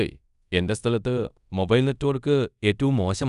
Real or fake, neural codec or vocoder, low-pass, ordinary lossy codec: fake; codec, 24 kHz, 0.5 kbps, DualCodec; 10.8 kHz; none